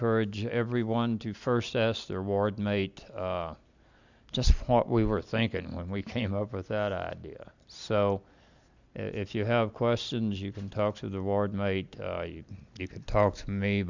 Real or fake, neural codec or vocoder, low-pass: real; none; 7.2 kHz